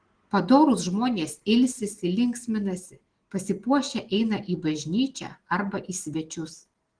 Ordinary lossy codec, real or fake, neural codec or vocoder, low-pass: Opus, 16 kbps; real; none; 9.9 kHz